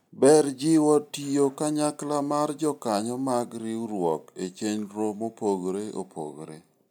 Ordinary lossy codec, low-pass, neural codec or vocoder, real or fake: none; none; none; real